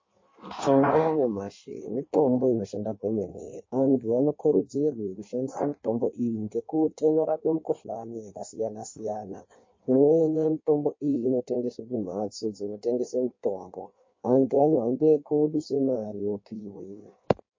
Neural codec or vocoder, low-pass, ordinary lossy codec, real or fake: codec, 16 kHz in and 24 kHz out, 1.1 kbps, FireRedTTS-2 codec; 7.2 kHz; MP3, 32 kbps; fake